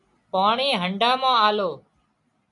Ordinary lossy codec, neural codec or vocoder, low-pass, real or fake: MP3, 64 kbps; none; 10.8 kHz; real